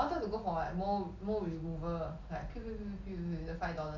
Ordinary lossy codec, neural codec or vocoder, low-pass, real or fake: none; none; 7.2 kHz; real